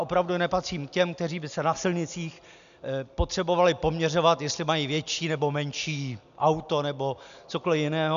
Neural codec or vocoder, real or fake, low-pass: none; real; 7.2 kHz